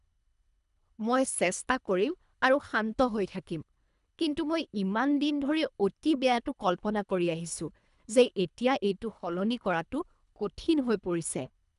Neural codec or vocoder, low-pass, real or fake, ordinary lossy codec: codec, 24 kHz, 3 kbps, HILCodec; 10.8 kHz; fake; none